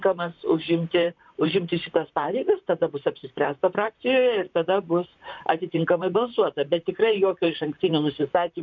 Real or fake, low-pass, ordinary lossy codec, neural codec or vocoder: fake; 7.2 kHz; MP3, 64 kbps; vocoder, 44.1 kHz, 128 mel bands, Pupu-Vocoder